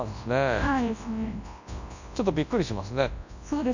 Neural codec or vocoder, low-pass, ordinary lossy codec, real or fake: codec, 24 kHz, 0.9 kbps, WavTokenizer, large speech release; 7.2 kHz; none; fake